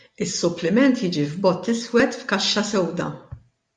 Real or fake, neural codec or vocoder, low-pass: real; none; 9.9 kHz